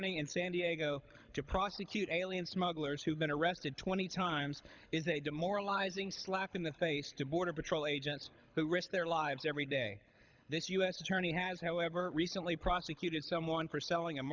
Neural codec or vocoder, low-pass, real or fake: codec, 24 kHz, 6 kbps, HILCodec; 7.2 kHz; fake